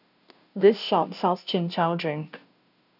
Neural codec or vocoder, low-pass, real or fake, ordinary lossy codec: codec, 16 kHz, 0.5 kbps, FunCodec, trained on Chinese and English, 25 frames a second; 5.4 kHz; fake; none